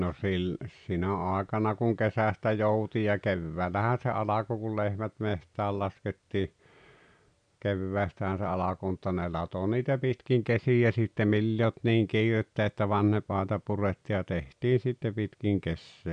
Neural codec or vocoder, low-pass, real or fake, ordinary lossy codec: none; 9.9 kHz; real; none